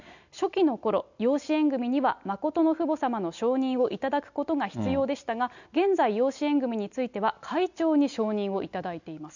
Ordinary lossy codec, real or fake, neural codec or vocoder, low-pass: none; real; none; 7.2 kHz